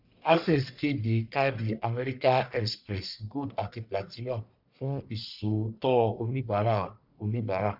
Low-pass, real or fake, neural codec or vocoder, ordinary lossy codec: 5.4 kHz; fake; codec, 44.1 kHz, 1.7 kbps, Pupu-Codec; none